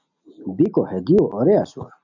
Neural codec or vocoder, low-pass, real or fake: none; 7.2 kHz; real